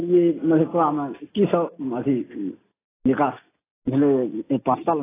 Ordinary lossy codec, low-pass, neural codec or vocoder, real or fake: AAC, 16 kbps; 3.6 kHz; none; real